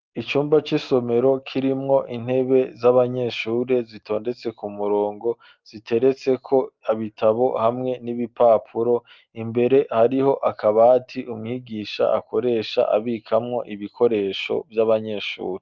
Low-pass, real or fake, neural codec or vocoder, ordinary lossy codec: 7.2 kHz; real; none; Opus, 24 kbps